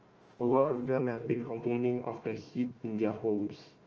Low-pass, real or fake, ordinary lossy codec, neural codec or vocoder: 7.2 kHz; fake; Opus, 24 kbps; codec, 16 kHz, 1 kbps, FunCodec, trained on Chinese and English, 50 frames a second